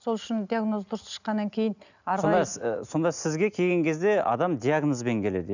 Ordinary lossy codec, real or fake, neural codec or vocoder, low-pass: none; real; none; 7.2 kHz